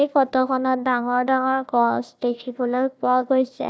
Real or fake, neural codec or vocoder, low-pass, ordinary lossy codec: fake; codec, 16 kHz, 1 kbps, FunCodec, trained on Chinese and English, 50 frames a second; none; none